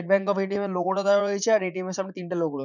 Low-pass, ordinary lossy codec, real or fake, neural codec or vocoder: 7.2 kHz; none; fake; vocoder, 44.1 kHz, 80 mel bands, Vocos